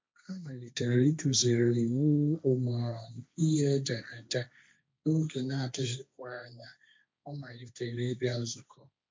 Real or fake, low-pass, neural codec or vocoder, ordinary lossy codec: fake; none; codec, 16 kHz, 1.1 kbps, Voila-Tokenizer; none